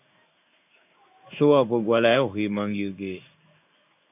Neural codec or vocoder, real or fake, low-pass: codec, 16 kHz in and 24 kHz out, 1 kbps, XY-Tokenizer; fake; 3.6 kHz